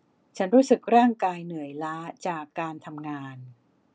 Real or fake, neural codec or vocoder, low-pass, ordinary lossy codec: real; none; none; none